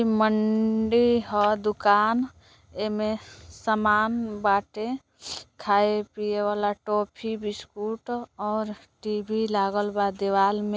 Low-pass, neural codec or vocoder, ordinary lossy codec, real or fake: none; none; none; real